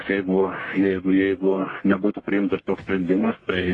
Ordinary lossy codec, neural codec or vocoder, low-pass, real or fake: AAC, 32 kbps; codec, 44.1 kHz, 1.7 kbps, Pupu-Codec; 10.8 kHz; fake